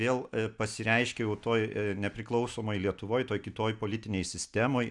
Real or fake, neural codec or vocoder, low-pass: real; none; 10.8 kHz